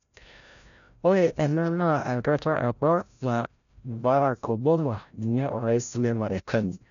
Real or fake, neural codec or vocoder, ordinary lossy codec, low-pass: fake; codec, 16 kHz, 0.5 kbps, FreqCodec, larger model; none; 7.2 kHz